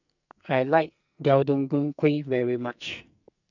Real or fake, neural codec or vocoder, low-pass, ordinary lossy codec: fake; codec, 44.1 kHz, 2.6 kbps, SNAC; 7.2 kHz; none